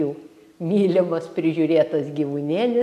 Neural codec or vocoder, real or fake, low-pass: none; real; 14.4 kHz